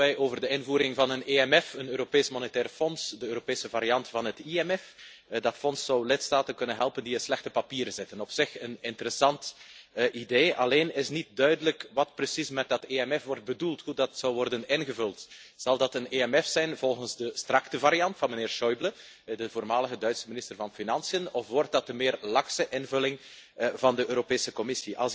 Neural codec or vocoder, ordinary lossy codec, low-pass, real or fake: none; none; none; real